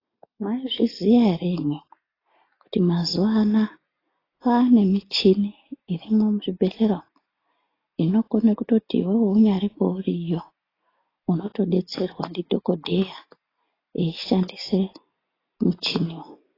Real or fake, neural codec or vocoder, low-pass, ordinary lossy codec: real; none; 5.4 kHz; AAC, 24 kbps